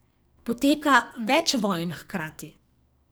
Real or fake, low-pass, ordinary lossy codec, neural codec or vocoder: fake; none; none; codec, 44.1 kHz, 2.6 kbps, SNAC